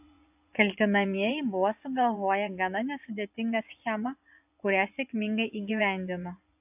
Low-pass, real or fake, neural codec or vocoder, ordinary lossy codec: 3.6 kHz; fake; vocoder, 24 kHz, 100 mel bands, Vocos; AAC, 32 kbps